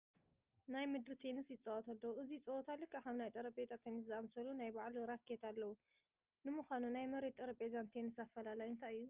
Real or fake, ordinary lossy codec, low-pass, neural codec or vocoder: real; Opus, 24 kbps; 3.6 kHz; none